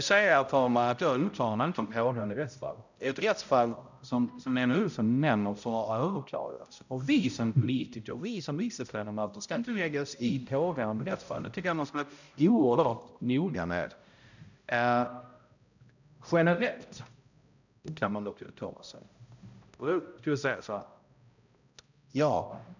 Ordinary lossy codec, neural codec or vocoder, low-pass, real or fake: none; codec, 16 kHz, 0.5 kbps, X-Codec, HuBERT features, trained on balanced general audio; 7.2 kHz; fake